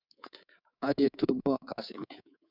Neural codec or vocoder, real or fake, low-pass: codec, 24 kHz, 3.1 kbps, DualCodec; fake; 5.4 kHz